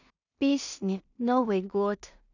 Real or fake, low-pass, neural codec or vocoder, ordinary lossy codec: fake; 7.2 kHz; codec, 16 kHz in and 24 kHz out, 0.4 kbps, LongCat-Audio-Codec, two codebook decoder; none